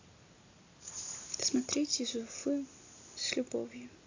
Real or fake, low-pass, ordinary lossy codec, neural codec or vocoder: real; 7.2 kHz; AAC, 48 kbps; none